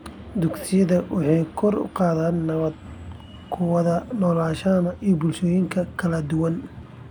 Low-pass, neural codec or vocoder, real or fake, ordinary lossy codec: 19.8 kHz; none; real; none